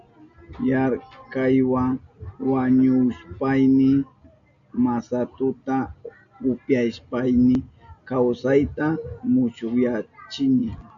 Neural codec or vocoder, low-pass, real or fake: none; 7.2 kHz; real